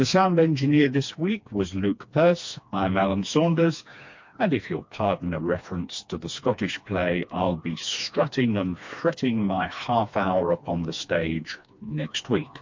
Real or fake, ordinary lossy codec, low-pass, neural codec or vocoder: fake; MP3, 48 kbps; 7.2 kHz; codec, 16 kHz, 2 kbps, FreqCodec, smaller model